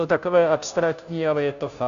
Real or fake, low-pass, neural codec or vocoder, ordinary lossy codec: fake; 7.2 kHz; codec, 16 kHz, 0.5 kbps, FunCodec, trained on Chinese and English, 25 frames a second; AAC, 96 kbps